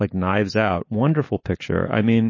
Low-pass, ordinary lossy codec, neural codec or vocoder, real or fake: 7.2 kHz; MP3, 32 kbps; none; real